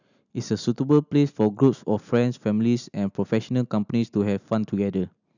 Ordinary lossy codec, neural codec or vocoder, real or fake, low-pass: none; none; real; 7.2 kHz